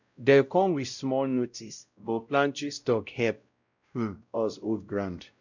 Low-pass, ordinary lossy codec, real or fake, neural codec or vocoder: 7.2 kHz; none; fake; codec, 16 kHz, 0.5 kbps, X-Codec, WavLM features, trained on Multilingual LibriSpeech